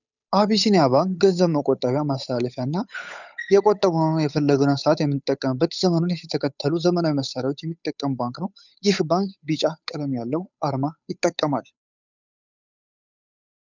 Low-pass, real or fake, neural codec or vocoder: 7.2 kHz; fake; codec, 16 kHz, 8 kbps, FunCodec, trained on Chinese and English, 25 frames a second